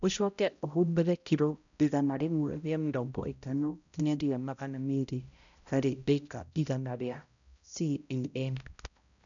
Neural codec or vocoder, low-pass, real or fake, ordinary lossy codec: codec, 16 kHz, 0.5 kbps, X-Codec, HuBERT features, trained on balanced general audio; 7.2 kHz; fake; none